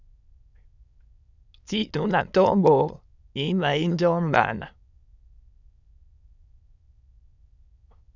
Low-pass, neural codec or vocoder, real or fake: 7.2 kHz; autoencoder, 22.05 kHz, a latent of 192 numbers a frame, VITS, trained on many speakers; fake